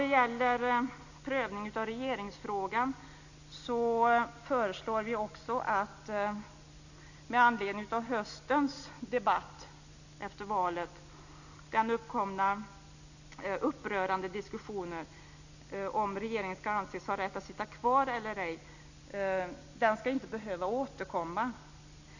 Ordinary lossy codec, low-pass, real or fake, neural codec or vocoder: none; 7.2 kHz; real; none